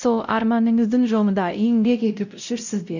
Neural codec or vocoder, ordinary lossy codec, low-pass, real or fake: codec, 16 kHz, 0.5 kbps, X-Codec, WavLM features, trained on Multilingual LibriSpeech; none; 7.2 kHz; fake